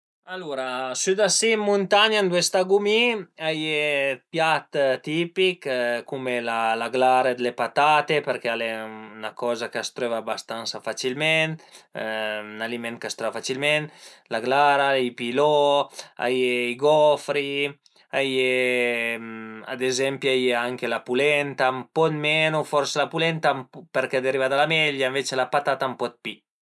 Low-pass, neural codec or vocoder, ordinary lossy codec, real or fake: none; none; none; real